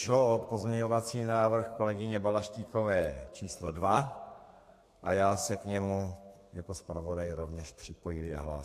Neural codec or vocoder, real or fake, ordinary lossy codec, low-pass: codec, 32 kHz, 1.9 kbps, SNAC; fake; AAC, 64 kbps; 14.4 kHz